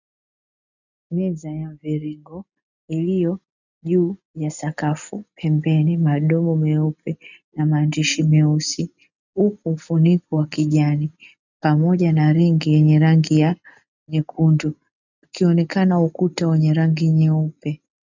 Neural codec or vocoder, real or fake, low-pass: none; real; 7.2 kHz